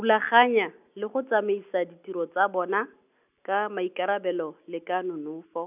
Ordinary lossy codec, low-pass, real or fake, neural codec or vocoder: none; 3.6 kHz; real; none